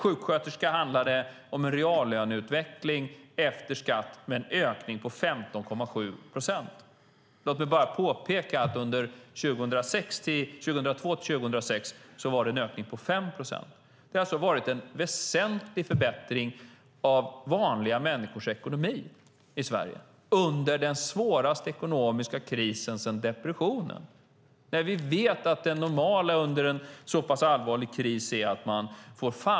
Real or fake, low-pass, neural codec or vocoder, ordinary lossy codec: real; none; none; none